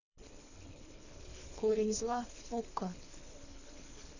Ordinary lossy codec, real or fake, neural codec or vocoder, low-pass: none; fake; codec, 24 kHz, 3 kbps, HILCodec; 7.2 kHz